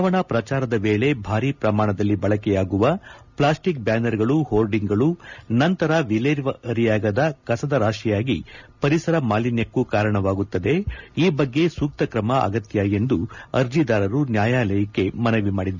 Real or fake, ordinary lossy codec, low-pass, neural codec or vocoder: real; none; 7.2 kHz; none